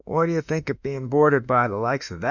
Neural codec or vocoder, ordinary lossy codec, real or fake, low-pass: autoencoder, 48 kHz, 32 numbers a frame, DAC-VAE, trained on Japanese speech; Opus, 64 kbps; fake; 7.2 kHz